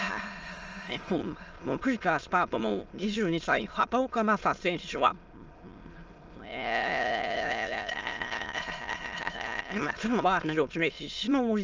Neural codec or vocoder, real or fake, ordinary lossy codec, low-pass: autoencoder, 22.05 kHz, a latent of 192 numbers a frame, VITS, trained on many speakers; fake; Opus, 24 kbps; 7.2 kHz